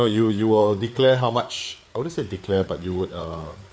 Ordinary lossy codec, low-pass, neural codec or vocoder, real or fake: none; none; codec, 16 kHz, 4 kbps, FreqCodec, larger model; fake